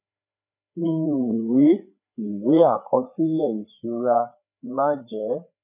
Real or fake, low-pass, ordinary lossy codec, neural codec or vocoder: fake; 3.6 kHz; none; codec, 16 kHz, 4 kbps, FreqCodec, larger model